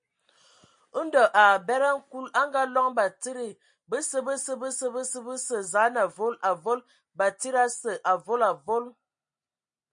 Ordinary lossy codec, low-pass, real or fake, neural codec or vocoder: MP3, 48 kbps; 9.9 kHz; real; none